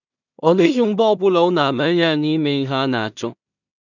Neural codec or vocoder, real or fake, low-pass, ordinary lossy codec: codec, 16 kHz in and 24 kHz out, 0.4 kbps, LongCat-Audio-Codec, two codebook decoder; fake; 7.2 kHz; none